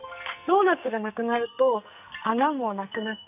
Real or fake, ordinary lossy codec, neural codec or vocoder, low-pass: fake; none; codec, 44.1 kHz, 2.6 kbps, SNAC; 3.6 kHz